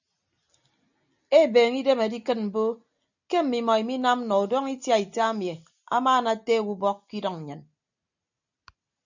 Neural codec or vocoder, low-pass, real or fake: none; 7.2 kHz; real